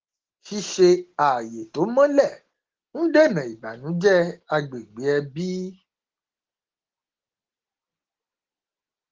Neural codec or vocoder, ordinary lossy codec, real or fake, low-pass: none; Opus, 16 kbps; real; 7.2 kHz